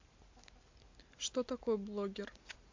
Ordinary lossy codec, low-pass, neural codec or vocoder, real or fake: MP3, 48 kbps; 7.2 kHz; none; real